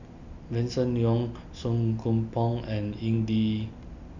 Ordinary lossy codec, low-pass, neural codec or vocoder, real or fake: none; 7.2 kHz; none; real